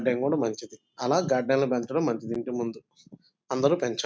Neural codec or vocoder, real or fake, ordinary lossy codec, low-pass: none; real; none; none